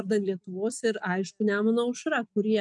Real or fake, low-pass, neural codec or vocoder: real; 10.8 kHz; none